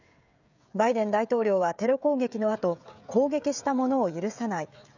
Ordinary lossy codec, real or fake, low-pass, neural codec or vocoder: none; fake; 7.2 kHz; codec, 16 kHz, 16 kbps, FreqCodec, smaller model